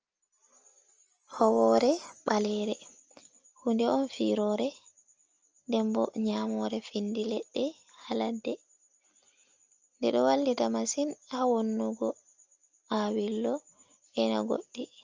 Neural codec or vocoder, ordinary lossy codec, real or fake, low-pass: none; Opus, 24 kbps; real; 7.2 kHz